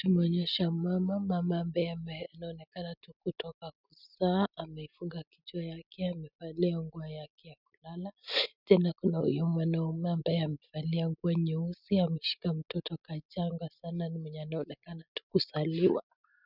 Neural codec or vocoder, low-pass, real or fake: none; 5.4 kHz; real